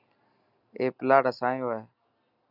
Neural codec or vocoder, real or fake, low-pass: none; real; 5.4 kHz